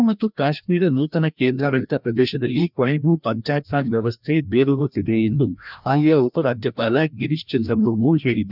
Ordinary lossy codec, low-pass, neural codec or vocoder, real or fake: none; 5.4 kHz; codec, 16 kHz, 1 kbps, FreqCodec, larger model; fake